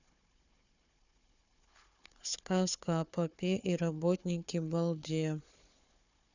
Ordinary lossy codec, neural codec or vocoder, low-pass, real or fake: none; codec, 16 kHz, 4 kbps, FunCodec, trained on Chinese and English, 50 frames a second; 7.2 kHz; fake